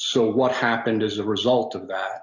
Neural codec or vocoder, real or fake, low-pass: none; real; 7.2 kHz